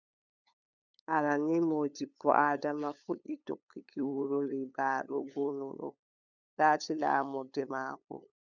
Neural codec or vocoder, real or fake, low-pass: codec, 16 kHz, 8 kbps, FunCodec, trained on LibriTTS, 25 frames a second; fake; 7.2 kHz